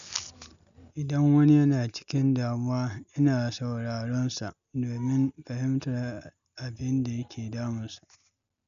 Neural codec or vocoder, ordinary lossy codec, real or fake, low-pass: none; none; real; 7.2 kHz